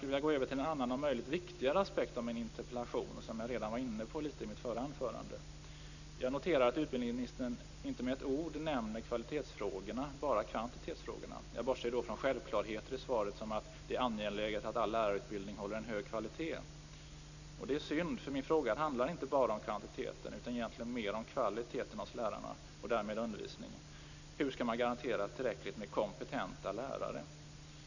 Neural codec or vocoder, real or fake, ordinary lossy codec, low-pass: none; real; none; 7.2 kHz